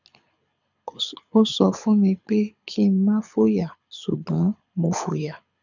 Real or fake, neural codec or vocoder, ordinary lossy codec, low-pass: fake; codec, 24 kHz, 6 kbps, HILCodec; none; 7.2 kHz